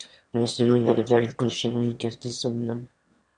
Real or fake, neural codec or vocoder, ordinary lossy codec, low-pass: fake; autoencoder, 22.05 kHz, a latent of 192 numbers a frame, VITS, trained on one speaker; MP3, 64 kbps; 9.9 kHz